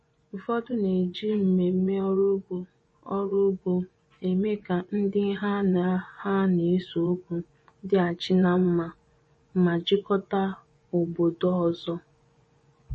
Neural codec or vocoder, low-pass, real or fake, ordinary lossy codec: vocoder, 24 kHz, 100 mel bands, Vocos; 10.8 kHz; fake; MP3, 32 kbps